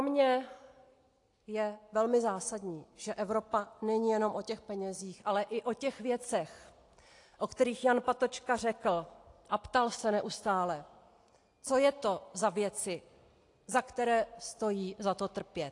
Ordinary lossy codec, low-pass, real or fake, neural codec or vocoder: AAC, 48 kbps; 10.8 kHz; real; none